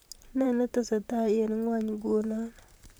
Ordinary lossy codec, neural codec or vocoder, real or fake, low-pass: none; vocoder, 44.1 kHz, 128 mel bands, Pupu-Vocoder; fake; none